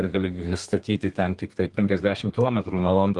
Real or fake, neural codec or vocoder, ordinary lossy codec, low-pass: fake; codec, 32 kHz, 1.9 kbps, SNAC; Opus, 24 kbps; 10.8 kHz